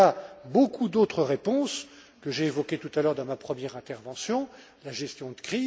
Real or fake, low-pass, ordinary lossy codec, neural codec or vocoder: real; none; none; none